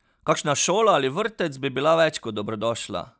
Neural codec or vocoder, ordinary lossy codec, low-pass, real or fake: none; none; none; real